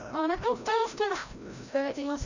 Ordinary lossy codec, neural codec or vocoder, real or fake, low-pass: none; codec, 16 kHz, 0.5 kbps, FreqCodec, larger model; fake; 7.2 kHz